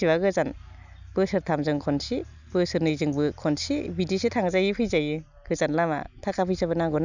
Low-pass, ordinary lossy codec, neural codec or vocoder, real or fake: 7.2 kHz; none; none; real